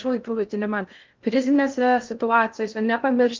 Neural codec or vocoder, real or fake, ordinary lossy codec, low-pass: codec, 16 kHz in and 24 kHz out, 0.6 kbps, FocalCodec, streaming, 2048 codes; fake; Opus, 24 kbps; 7.2 kHz